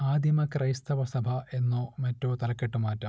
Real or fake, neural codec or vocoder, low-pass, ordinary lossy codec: real; none; none; none